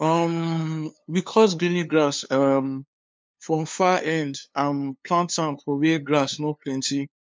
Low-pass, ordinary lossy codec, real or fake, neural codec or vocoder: none; none; fake; codec, 16 kHz, 2 kbps, FunCodec, trained on LibriTTS, 25 frames a second